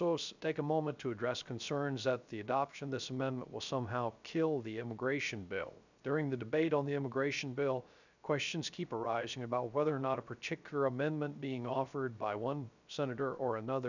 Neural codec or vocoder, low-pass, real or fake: codec, 16 kHz, 0.3 kbps, FocalCodec; 7.2 kHz; fake